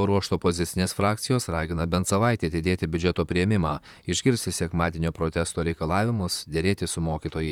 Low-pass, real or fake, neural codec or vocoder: 19.8 kHz; fake; vocoder, 44.1 kHz, 128 mel bands, Pupu-Vocoder